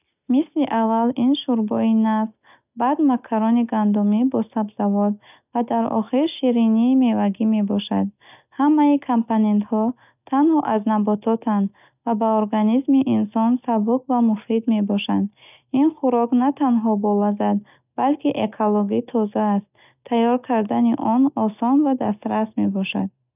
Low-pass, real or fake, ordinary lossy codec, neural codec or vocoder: 3.6 kHz; real; none; none